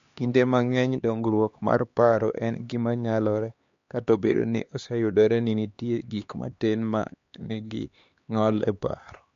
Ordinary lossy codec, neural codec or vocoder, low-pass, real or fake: MP3, 48 kbps; codec, 16 kHz, 2 kbps, X-Codec, HuBERT features, trained on LibriSpeech; 7.2 kHz; fake